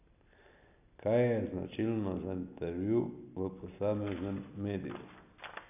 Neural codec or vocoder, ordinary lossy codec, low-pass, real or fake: none; none; 3.6 kHz; real